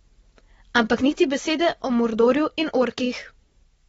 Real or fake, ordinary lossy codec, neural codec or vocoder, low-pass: real; AAC, 24 kbps; none; 19.8 kHz